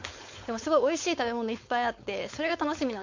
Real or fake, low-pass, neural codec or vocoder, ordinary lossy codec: fake; 7.2 kHz; codec, 16 kHz, 4.8 kbps, FACodec; MP3, 48 kbps